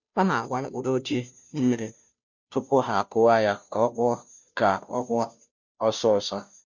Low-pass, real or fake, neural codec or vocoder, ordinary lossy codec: 7.2 kHz; fake; codec, 16 kHz, 0.5 kbps, FunCodec, trained on Chinese and English, 25 frames a second; none